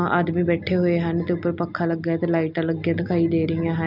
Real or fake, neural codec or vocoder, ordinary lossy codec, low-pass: real; none; none; 5.4 kHz